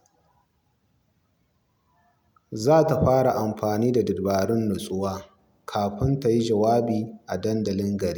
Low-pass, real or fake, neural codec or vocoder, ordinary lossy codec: none; real; none; none